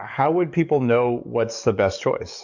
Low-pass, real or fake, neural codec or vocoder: 7.2 kHz; fake; codec, 44.1 kHz, 7.8 kbps, DAC